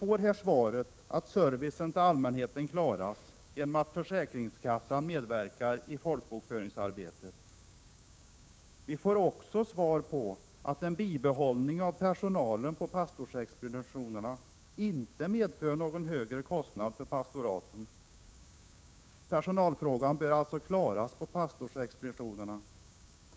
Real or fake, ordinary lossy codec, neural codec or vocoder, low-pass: fake; none; codec, 16 kHz, 6 kbps, DAC; none